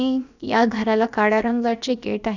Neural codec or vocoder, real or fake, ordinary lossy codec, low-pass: codec, 16 kHz, about 1 kbps, DyCAST, with the encoder's durations; fake; none; 7.2 kHz